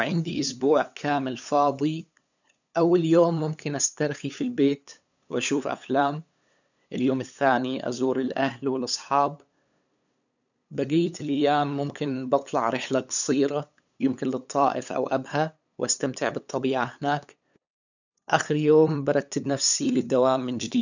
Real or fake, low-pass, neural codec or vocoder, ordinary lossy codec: fake; 7.2 kHz; codec, 16 kHz, 8 kbps, FunCodec, trained on LibriTTS, 25 frames a second; none